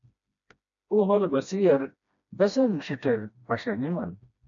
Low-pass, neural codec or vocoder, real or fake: 7.2 kHz; codec, 16 kHz, 1 kbps, FreqCodec, smaller model; fake